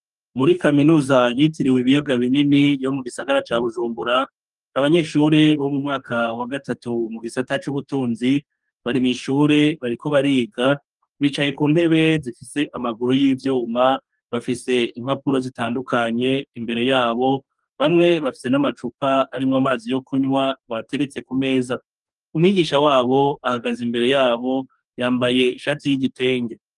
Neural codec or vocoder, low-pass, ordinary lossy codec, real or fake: codec, 44.1 kHz, 2.6 kbps, DAC; 10.8 kHz; Opus, 32 kbps; fake